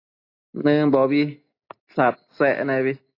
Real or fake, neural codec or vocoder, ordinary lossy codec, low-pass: real; none; AAC, 32 kbps; 5.4 kHz